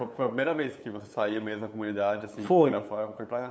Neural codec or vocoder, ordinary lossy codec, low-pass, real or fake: codec, 16 kHz, 8 kbps, FunCodec, trained on LibriTTS, 25 frames a second; none; none; fake